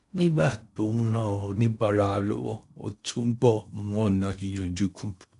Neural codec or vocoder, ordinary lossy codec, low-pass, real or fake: codec, 16 kHz in and 24 kHz out, 0.6 kbps, FocalCodec, streaming, 4096 codes; none; 10.8 kHz; fake